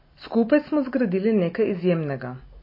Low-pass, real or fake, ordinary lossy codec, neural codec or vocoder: 5.4 kHz; real; MP3, 24 kbps; none